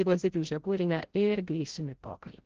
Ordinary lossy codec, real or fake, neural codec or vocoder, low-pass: Opus, 16 kbps; fake; codec, 16 kHz, 0.5 kbps, FreqCodec, larger model; 7.2 kHz